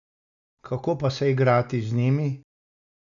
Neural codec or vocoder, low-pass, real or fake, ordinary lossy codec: none; 7.2 kHz; real; none